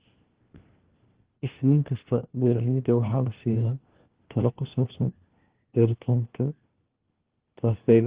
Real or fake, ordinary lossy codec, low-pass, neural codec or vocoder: fake; Opus, 16 kbps; 3.6 kHz; codec, 16 kHz, 1 kbps, FunCodec, trained on LibriTTS, 50 frames a second